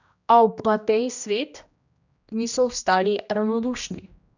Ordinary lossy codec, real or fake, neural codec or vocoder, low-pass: none; fake; codec, 16 kHz, 1 kbps, X-Codec, HuBERT features, trained on general audio; 7.2 kHz